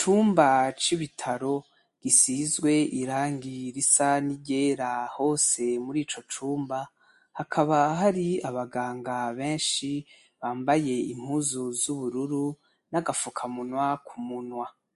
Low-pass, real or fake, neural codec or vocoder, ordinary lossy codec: 14.4 kHz; real; none; MP3, 48 kbps